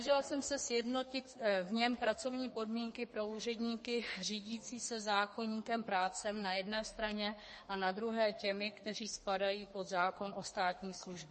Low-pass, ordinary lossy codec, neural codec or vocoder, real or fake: 10.8 kHz; MP3, 32 kbps; codec, 32 kHz, 1.9 kbps, SNAC; fake